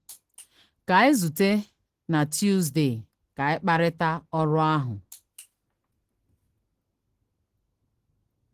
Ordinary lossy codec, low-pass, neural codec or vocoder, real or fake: Opus, 16 kbps; 14.4 kHz; none; real